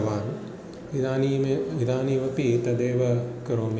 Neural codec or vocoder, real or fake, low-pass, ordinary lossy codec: none; real; none; none